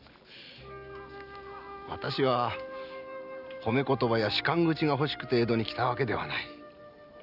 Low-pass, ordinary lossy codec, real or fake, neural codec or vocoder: 5.4 kHz; none; real; none